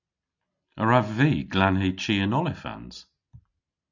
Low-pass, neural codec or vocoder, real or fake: 7.2 kHz; none; real